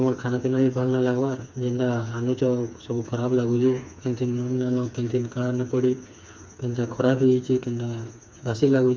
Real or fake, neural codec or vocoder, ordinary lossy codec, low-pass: fake; codec, 16 kHz, 4 kbps, FreqCodec, smaller model; none; none